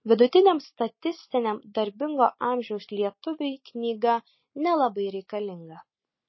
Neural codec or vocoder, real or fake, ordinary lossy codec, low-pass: none; real; MP3, 24 kbps; 7.2 kHz